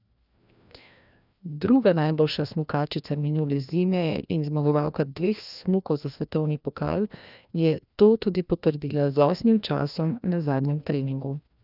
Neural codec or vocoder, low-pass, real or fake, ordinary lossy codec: codec, 16 kHz, 1 kbps, FreqCodec, larger model; 5.4 kHz; fake; none